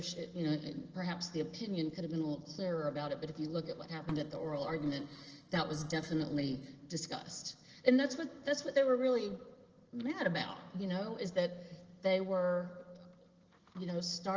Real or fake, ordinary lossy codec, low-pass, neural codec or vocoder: real; Opus, 16 kbps; 7.2 kHz; none